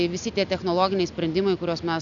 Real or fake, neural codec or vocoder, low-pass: real; none; 7.2 kHz